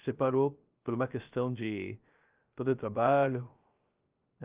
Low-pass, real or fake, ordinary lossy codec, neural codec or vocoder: 3.6 kHz; fake; Opus, 24 kbps; codec, 16 kHz, 0.3 kbps, FocalCodec